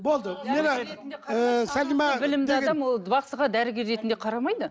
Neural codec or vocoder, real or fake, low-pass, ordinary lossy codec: none; real; none; none